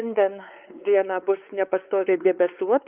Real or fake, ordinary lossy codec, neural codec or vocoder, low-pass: fake; Opus, 24 kbps; codec, 16 kHz, 4 kbps, X-Codec, WavLM features, trained on Multilingual LibriSpeech; 3.6 kHz